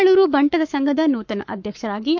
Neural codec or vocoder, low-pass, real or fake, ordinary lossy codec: codec, 44.1 kHz, 7.8 kbps, Pupu-Codec; 7.2 kHz; fake; MP3, 64 kbps